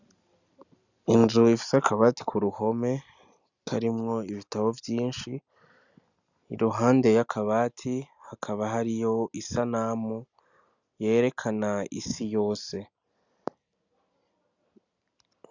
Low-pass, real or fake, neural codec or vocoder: 7.2 kHz; real; none